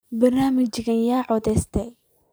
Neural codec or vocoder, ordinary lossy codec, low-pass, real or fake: vocoder, 44.1 kHz, 128 mel bands every 512 samples, BigVGAN v2; none; none; fake